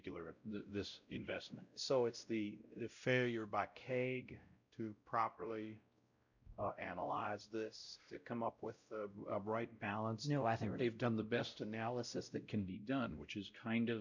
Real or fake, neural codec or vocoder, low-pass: fake; codec, 16 kHz, 0.5 kbps, X-Codec, WavLM features, trained on Multilingual LibriSpeech; 7.2 kHz